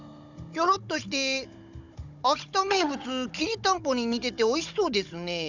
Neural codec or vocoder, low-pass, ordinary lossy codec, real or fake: codec, 16 kHz, 16 kbps, FunCodec, trained on Chinese and English, 50 frames a second; 7.2 kHz; none; fake